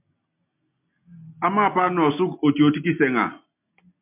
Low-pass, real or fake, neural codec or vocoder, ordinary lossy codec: 3.6 kHz; real; none; MP3, 32 kbps